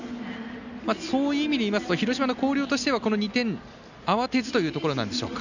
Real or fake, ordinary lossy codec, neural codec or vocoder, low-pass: real; none; none; 7.2 kHz